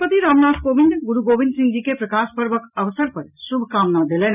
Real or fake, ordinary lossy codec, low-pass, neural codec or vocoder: real; none; 3.6 kHz; none